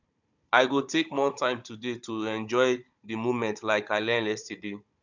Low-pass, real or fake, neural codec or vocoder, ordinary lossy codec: 7.2 kHz; fake; codec, 16 kHz, 16 kbps, FunCodec, trained on Chinese and English, 50 frames a second; none